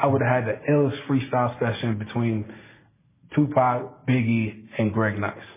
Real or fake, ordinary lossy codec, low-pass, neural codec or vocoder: real; MP3, 16 kbps; 3.6 kHz; none